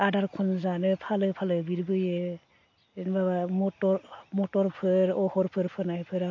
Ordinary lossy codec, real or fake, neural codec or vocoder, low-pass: MP3, 48 kbps; real; none; 7.2 kHz